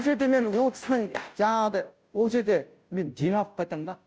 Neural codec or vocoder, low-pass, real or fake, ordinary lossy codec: codec, 16 kHz, 0.5 kbps, FunCodec, trained on Chinese and English, 25 frames a second; none; fake; none